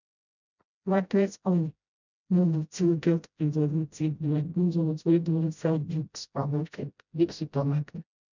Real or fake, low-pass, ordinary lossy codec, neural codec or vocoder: fake; 7.2 kHz; none; codec, 16 kHz, 0.5 kbps, FreqCodec, smaller model